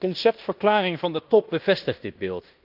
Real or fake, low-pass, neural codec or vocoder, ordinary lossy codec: fake; 5.4 kHz; codec, 16 kHz in and 24 kHz out, 0.9 kbps, LongCat-Audio-Codec, fine tuned four codebook decoder; Opus, 24 kbps